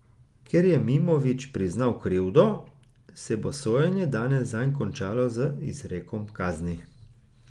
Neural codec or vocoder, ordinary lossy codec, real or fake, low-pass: none; Opus, 24 kbps; real; 10.8 kHz